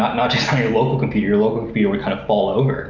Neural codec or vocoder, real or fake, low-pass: none; real; 7.2 kHz